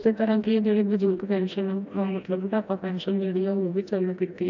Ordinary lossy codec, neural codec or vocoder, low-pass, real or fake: MP3, 48 kbps; codec, 16 kHz, 1 kbps, FreqCodec, smaller model; 7.2 kHz; fake